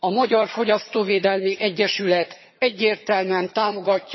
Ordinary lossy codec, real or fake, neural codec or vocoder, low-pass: MP3, 24 kbps; fake; vocoder, 22.05 kHz, 80 mel bands, HiFi-GAN; 7.2 kHz